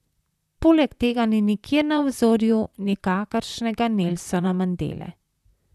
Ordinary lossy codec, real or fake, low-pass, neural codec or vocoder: none; fake; 14.4 kHz; vocoder, 44.1 kHz, 128 mel bands, Pupu-Vocoder